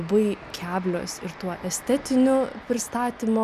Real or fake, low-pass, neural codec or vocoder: real; 14.4 kHz; none